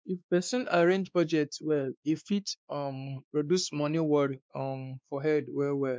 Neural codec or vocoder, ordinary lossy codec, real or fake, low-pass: codec, 16 kHz, 2 kbps, X-Codec, WavLM features, trained on Multilingual LibriSpeech; none; fake; none